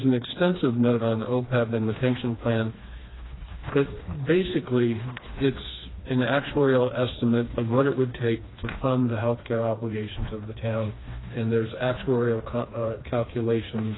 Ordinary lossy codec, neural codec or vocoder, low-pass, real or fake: AAC, 16 kbps; codec, 16 kHz, 2 kbps, FreqCodec, smaller model; 7.2 kHz; fake